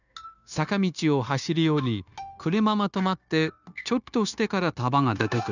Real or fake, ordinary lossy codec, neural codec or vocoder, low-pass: fake; none; codec, 16 kHz, 0.9 kbps, LongCat-Audio-Codec; 7.2 kHz